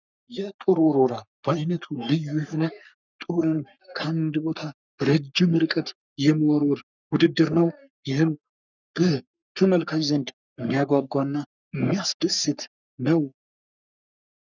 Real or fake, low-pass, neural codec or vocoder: fake; 7.2 kHz; codec, 44.1 kHz, 3.4 kbps, Pupu-Codec